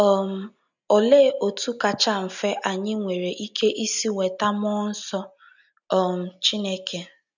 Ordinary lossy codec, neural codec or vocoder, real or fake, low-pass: none; none; real; 7.2 kHz